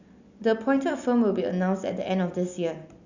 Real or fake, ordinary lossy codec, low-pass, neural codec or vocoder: real; Opus, 64 kbps; 7.2 kHz; none